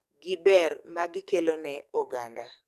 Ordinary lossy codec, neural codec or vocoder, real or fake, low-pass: none; codec, 44.1 kHz, 2.6 kbps, SNAC; fake; 14.4 kHz